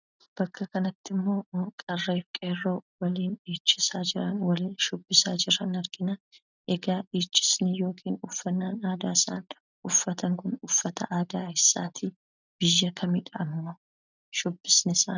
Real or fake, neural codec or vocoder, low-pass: real; none; 7.2 kHz